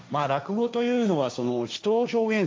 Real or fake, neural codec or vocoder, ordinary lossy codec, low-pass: fake; codec, 16 kHz, 1.1 kbps, Voila-Tokenizer; none; none